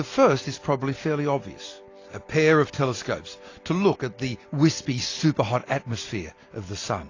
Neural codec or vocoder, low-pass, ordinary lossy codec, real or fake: none; 7.2 kHz; AAC, 32 kbps; real